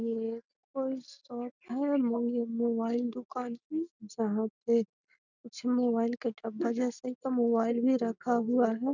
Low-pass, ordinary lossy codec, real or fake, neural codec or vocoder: 7.2 kHz; none; real; none